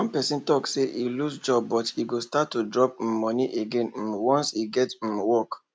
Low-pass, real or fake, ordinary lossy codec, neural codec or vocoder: none; real; none; none